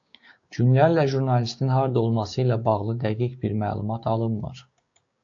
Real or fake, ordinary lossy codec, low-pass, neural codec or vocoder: fake; AAC, 48 kbps; 7.2 kHz; codec, 16 kHz, 6 kbps, DAC